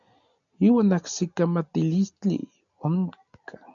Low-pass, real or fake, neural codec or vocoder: 7.2 kHz; real; none